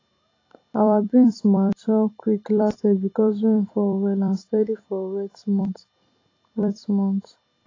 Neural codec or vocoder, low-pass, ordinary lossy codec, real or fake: vocoder, 44.1 kHz, 128 mel bands every 256 samples, BigVGAN v2; 7.2 kHz; AAC, 32 kbps; fake